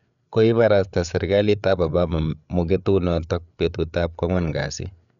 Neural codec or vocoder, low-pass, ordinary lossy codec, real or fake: codec, 16 kHz, 8 kbps, FreqCodec, larger model; 7.2 kHz; none; fake